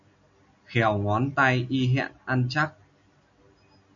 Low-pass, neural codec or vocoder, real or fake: 7.2 kHz; none; real